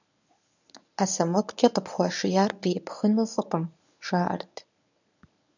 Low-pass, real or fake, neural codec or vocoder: 7.2 kHz; fake; codec, 24 kHz, 0.9 kbps, WavTokenizer, medium speech release version 1